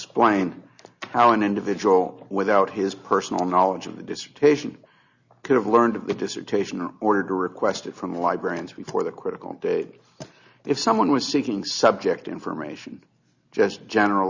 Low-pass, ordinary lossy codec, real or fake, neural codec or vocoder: 7.2 kHz; Opus, 64 kbps; real; none